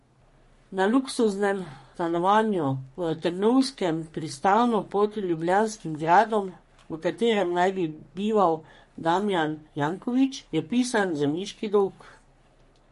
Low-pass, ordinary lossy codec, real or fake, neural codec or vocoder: 14.4 kHz; MP3, 48 kbps; fake; codec, 44.1 kHz, 3.4 kbps, Pupu-Codec